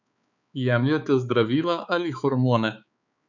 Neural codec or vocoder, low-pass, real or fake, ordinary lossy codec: codec, 16 kHz, 4 kbps, X-Codec, HuBERT features, trained on balanced general audio; 7.2 kHz; fake; none